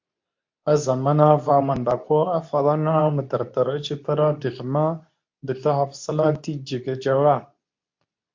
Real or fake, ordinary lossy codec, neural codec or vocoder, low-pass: fake; MP3, 48 kbps; codec, 24 kHz, 0.9 kbps, WavTokenizer, medium speech release version 2; 7.2 kHz